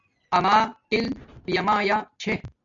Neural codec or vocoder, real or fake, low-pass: none; real; 7.2 kHz